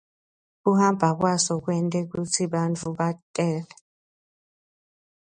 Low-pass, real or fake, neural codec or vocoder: 9.9 kHz; real; none